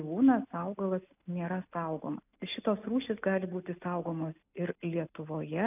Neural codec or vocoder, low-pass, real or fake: none; 3.6 kHz; real